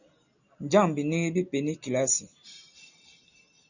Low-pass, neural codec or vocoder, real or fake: 7.2 kHz; none; real